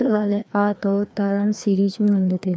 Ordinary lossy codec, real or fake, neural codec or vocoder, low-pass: none; fake; codec, 16 kHz, 2 kbps, FreqCodec, larger model; none